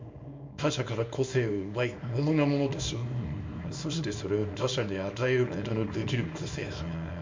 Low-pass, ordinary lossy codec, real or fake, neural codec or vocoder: 7.2 kHz; MP3, 64 kbps; fake; codec, 24 kHz, 0.9 kbps, WavTokenizer, small release